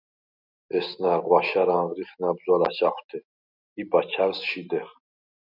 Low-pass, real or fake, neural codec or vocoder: 5.4 kHz; real; none